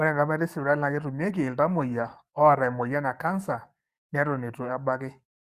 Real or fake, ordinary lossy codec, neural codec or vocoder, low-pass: fake; Opus, 64 kbps; codec, 44.1 kHz, 7.8 kbps, DAC; 14.4 kHz